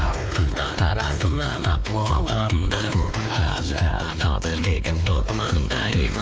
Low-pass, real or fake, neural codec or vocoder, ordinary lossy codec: none; fake; codec, 16 kHz, 2 kbps, X-Codec, WavLM features, trained on Multilingual LibriSpeech; none